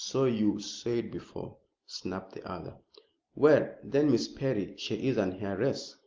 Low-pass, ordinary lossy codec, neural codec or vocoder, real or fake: 7.2 kHz; Opus, 24 kbps; none; real